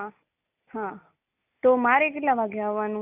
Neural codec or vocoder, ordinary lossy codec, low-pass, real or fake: none; none; 3.6 kHz; real